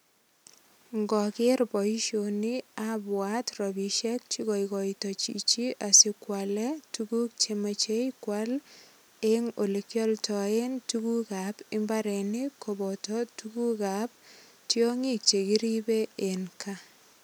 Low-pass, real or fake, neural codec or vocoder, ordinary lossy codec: none; real; none; none